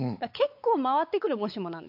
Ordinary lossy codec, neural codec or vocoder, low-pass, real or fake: AAC, 48 kbps; codec, 16 kHz, 4 kbps, X-Codec, WavLM features, trained on Multilingual LibriSpeech; 5.4 kHz; fake